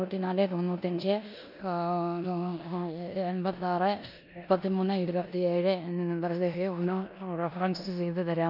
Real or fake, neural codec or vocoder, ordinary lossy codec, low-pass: fake; codec, 16 kHz in and 24 kHz out, 0.9 kbps, LongCat-Audio-Codec, four codebook decoder; none; 5.4 kHz